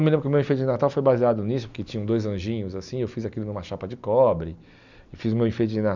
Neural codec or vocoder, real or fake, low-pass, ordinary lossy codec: none; real; 7.2 kHz; none